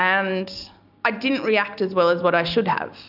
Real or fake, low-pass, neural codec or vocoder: real; 5.4 kHz; none